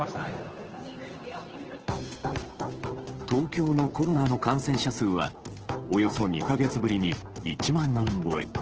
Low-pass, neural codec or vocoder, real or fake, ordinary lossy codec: 7.2 kHz; codec, 16 kHz, 2 kbps, FunCodec, trained on Chinese and English, 25 frames a second; fake; Opus, 16 kbps